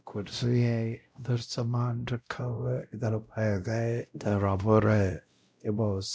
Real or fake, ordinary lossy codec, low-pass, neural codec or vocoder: fake; none; none; codec, 16 kHz, 0.5 kbps, X-Codec, WavLM features, trained on Multilingual LibriSpeech